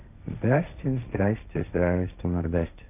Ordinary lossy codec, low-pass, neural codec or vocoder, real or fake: none; 3.6 kHz; codec, 16 kHz, 1.1 kbps, Voila-Tokenizer; fake